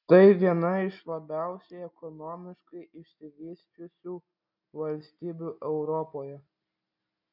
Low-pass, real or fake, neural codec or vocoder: 5.4 kHz; real; none